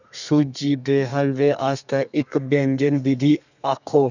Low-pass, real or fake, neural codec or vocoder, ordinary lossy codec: 7.2 kHz; fake; codec, 32 kHz, 1.9 kbps, SNAC; none